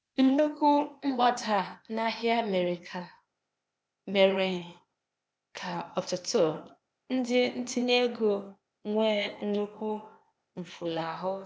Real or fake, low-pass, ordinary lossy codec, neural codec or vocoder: fake; none; none; codec, 16 kHz, 0.8 kbps, ZipCodec